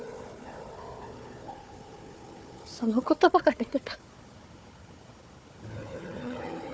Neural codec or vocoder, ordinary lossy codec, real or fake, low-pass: codec, 16 kHz, 4 kbps, FunCodec, trained on Chinese and English, 50 frames a second; none; fake; none